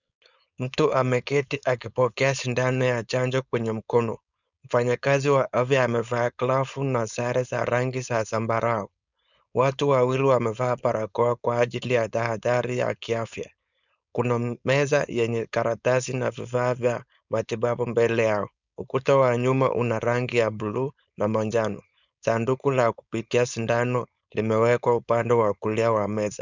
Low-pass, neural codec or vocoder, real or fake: 7.2 kHz; codec, 16 kHz, 4.8 kbps, FACodec; fake